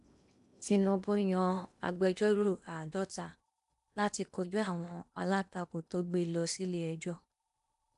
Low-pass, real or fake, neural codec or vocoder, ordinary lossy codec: 10.8 kHz; fake; codec, 16 kHz in and 24 kHz out, 0.8 kbps, FocalCodec, streaming, 65536 codes; none